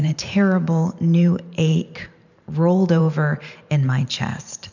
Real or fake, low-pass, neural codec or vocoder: fake; 7.2 kHz; vocoder, 44.1 kHz, 128 mel bands every 512 samples, BigVGAN v2